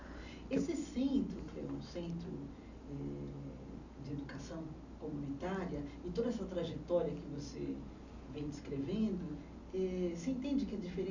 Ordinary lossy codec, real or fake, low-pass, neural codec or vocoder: none; real; 7.2 kHz; none